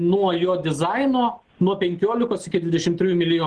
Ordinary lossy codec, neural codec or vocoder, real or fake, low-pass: Opus, 16 kbps; none; real; 10.8 kHz